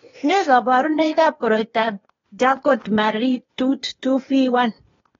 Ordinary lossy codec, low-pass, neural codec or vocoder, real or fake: AAC, 24 kbps; 7.2 kHz; codec, 16 kHz, 0.8 kbps, ZipCodec; fake